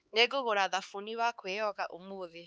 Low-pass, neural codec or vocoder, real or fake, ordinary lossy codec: none; codec, 16 kHz, 4 kbps, X-Codec, HuBERT features, trained on LibriSpeech; fake; none